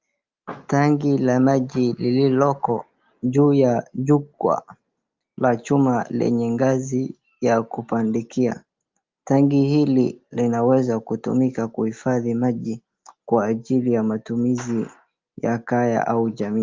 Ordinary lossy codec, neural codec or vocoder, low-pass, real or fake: Opus, 32 kbps; none; 7.2 kHz; real